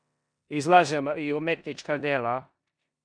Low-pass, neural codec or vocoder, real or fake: 9.9 kHz; codec, 16 kHz in and 24 kHz out, 0.9 kbps, LongCat-Audio-Codec, four codebook decoder; fake